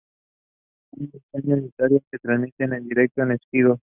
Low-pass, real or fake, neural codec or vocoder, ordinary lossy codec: 3.6 kHz; real; none; Opus, 64 kbps